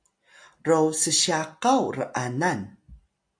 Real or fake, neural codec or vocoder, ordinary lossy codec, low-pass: real; none; AAC, 64 kbps; 9.9 kHz